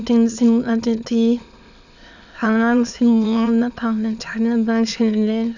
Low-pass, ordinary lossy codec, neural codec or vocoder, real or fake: 7.2 kHz; none; autoencoder, 22.05 kHz, a latent of 192 numbers a frame, VITS, trained on many speakers; fake